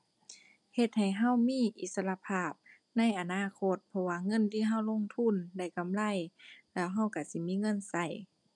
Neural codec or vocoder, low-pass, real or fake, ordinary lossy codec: none; 10.8 kHz; real; none